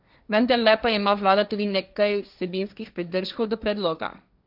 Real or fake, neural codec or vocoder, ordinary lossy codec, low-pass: fake; codec, 16 kHz, 1.1 kbps, Voila-Tokenizer; none; 5.4 kHz